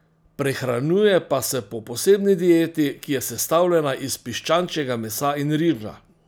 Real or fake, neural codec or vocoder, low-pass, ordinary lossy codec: real; none; none; none